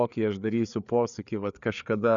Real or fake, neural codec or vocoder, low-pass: fake; codec, 16 kHz, 4 kbps, FreqCodec, larger model; 7.2 kHz